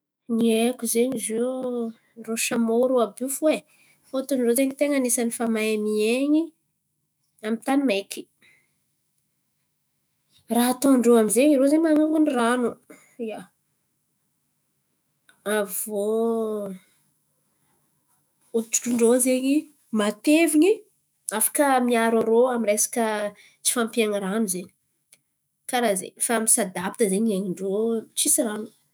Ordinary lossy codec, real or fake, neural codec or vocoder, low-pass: none; fake; autoencoder, 48 kHz, 128 numbers a frame, DAC-VAE, trained on Japanese speech; none